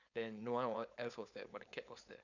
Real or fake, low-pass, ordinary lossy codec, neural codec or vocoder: fake; 7.2 kHz; none; codec, 16 kHz, 4.8 kbps, FACodec